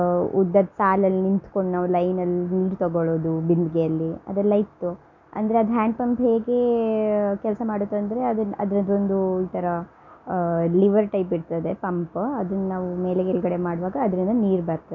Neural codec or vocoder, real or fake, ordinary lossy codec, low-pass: none; real; none; 7.2 kHz